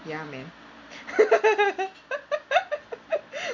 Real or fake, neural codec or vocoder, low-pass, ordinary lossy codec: real; none; 7.2 kHz; MP3, 48 kbps